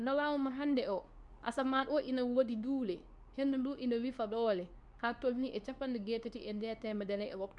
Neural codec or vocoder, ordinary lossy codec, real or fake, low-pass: codec, 24 kHz, 0.9 kbps, WavTokenizer, medium speech release version 2; none; fake; 10.8 kHz